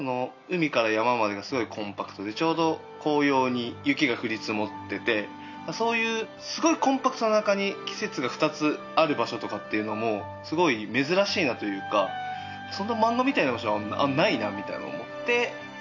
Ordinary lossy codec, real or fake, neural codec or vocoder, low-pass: none; real; none; 7.2 kHz